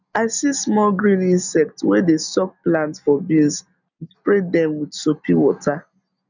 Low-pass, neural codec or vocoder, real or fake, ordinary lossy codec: 7.2 kHz; none; real; none